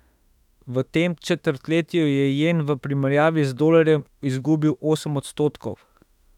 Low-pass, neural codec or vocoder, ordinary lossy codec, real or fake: 19.8 kHz; autoencoder, 48 kHz, 32 numbers a frame, DAC-VAE, trained on Japanese speech; none; fake